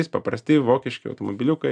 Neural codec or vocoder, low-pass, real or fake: none; 9.9 kHz; real